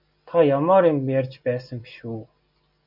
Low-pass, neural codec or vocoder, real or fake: 5.4 kHz; none; real